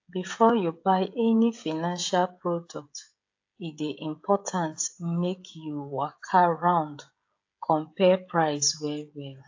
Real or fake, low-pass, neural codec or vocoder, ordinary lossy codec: fake; 7.2 kHz; codec, 16 kHz, 16 kbps, FreqCodec, smaller model; AAC, 48 kbps